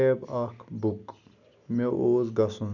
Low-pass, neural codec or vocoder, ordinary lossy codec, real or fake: 7.2 kHz; none; none; real